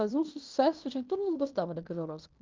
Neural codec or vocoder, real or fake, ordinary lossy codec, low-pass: codec, 16 kHz in and 24 kHz out, 0.9 kbps, LongCat-Audio-Codec, fine tuned four codebook decoder; fake; Opus, 32 kbps; 7.2 kHz